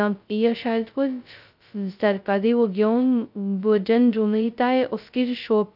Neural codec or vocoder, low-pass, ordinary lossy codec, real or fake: codec, 16 kHz, 0.2 kbps, FocalCodec; 5.4 kHz; none; fake